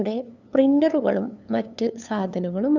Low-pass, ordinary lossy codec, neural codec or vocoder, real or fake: 7.2 kHz; none; codec, 16 kHz, 2 kbps, FunCodec, trained on LibriTTS, 25 frames a second; fake